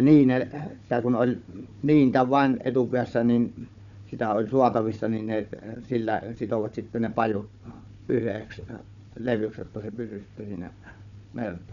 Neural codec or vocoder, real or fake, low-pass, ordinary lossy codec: codec, 16 kHz, 4 kbps, FunCodec, trained on Chinese and English, 50 frames a second; fake; 7.2 kHz; none